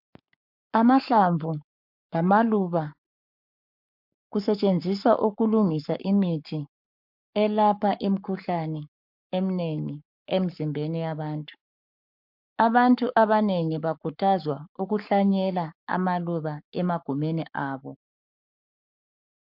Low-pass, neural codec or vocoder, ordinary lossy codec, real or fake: 5.4 kHz; codec, 44.1 kHz, 7.8 kbps, Pupu-Codec; MP3, 48 kbps; fake